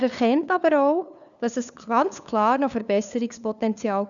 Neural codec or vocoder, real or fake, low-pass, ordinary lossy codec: codec, 16 kHz, 2 kbps, FunCodec, trained on LibriTTS, 25 frames a second; fake; 7.2 kHz; none